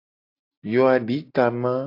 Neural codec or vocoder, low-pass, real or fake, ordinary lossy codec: none; 5.4 kHz; real; AAC, 32 kbps